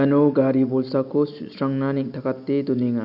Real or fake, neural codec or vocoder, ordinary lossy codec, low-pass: real; none; none; 5.4 kHz